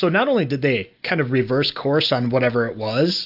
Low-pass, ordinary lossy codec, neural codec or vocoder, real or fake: 5.4 kHz; AAC, 48 kbps; none; real